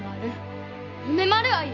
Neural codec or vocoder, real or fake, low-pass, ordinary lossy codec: none; real; 7.2 kHz; none